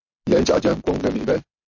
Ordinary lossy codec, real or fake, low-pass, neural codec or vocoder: MP3, 32 kbps; real; 7.2 kHz; none